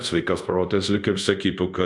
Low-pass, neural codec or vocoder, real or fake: 10.8 kHz; codec, 24 kHz, 0.5 kbps, DualCodec; fake